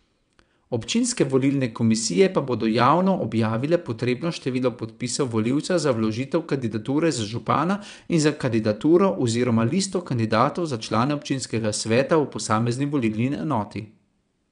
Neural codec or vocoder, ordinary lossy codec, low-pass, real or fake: vocoder, 22.05 kHz, 80 mel bands, WaveNeXt; none; 9.9 kHz; fake